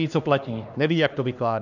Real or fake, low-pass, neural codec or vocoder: fake; 7.2 kHz; codec, 16 kHz, 2 kbps, X-Codec, HuBERT features, trained on LibriSpeech